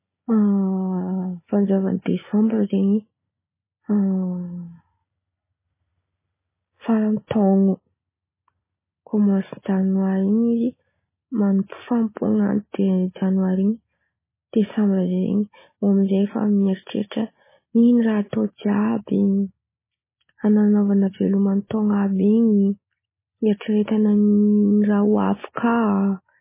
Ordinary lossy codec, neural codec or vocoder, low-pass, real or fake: MP3, 16 kbps; none; 3.6 kHz; real